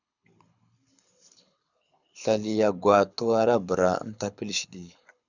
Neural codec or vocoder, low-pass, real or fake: codec, 24 kHz, 6 kbps, HILCodec; 7.2 kHz; fake